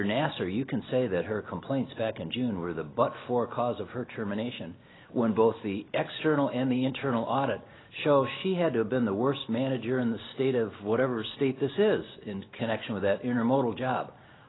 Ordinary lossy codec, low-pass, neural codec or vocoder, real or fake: AAC, 16 kbps; 7.2 kHz; none; real